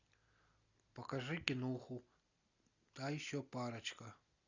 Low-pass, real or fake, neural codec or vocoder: 7.2 kHz; real; none